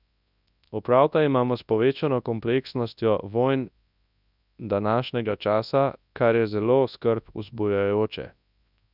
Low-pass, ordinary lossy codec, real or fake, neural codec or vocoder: 5.4 kHz; none; fake; codec, 24 kHz, 0.9 kbps, WavTokenizer, large speech release